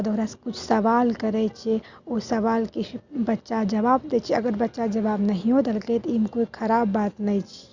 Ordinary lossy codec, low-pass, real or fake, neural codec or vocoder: Opus, 64 kbps; 7.2 kHz; real; none